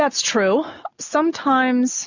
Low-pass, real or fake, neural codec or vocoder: 7.2 kHz; real; none